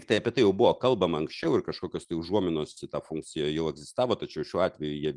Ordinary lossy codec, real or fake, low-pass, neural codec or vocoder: Opus, 32 kbps; real; 10.8 kHz; none